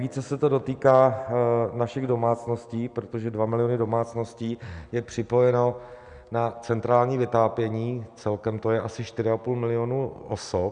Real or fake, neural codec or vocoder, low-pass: real; none; 9.9 kHz